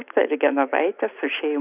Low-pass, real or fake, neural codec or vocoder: 3.6 kHz; real; none